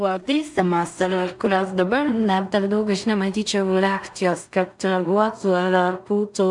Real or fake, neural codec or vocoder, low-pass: fake; codec, 16 kHz in and 24 kHz out, 0.4 kbps, LongCat-Audio-Codec, two codebook decoder; 10.8 kHz